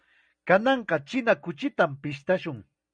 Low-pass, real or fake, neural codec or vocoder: 9.9 kHz; real; none